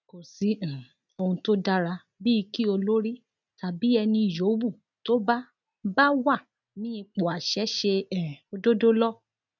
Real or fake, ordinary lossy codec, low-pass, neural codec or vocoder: real; none; 7.2 kHz; none